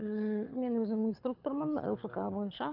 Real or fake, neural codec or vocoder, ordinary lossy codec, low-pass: fake; codec, 24 kHz, 6 kbps, HILCodec; none; 5.4 kHz